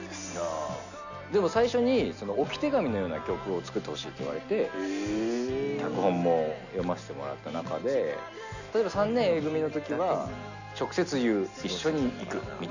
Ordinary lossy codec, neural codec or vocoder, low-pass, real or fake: none; none; 7.2 kHz; real